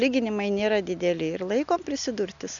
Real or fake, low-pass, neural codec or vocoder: real; 7.2 kHz; none